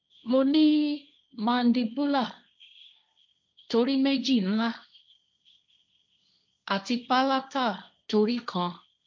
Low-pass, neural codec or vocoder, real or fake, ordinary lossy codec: none; codec, 16 kHz, 1.1 kbps, Voila-Tokenizer; fake; none